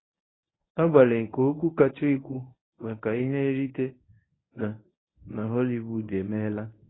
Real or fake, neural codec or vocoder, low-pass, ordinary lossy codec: fake; codec, 24 kHz, 0.9 kbps, WavTokenizer, medium speech release version 1; 7.2 kHz; AAC, 16 kbps